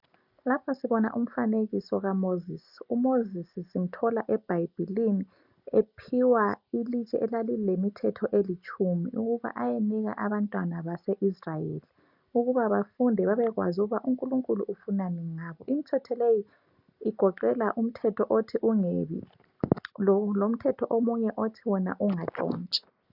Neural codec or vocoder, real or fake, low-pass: none; real; 5.4 kHz